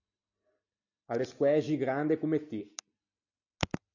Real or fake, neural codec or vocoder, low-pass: real; none; 7.2 kHz